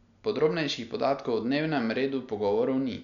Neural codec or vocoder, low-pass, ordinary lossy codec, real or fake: none; 7.2 kHz; none; real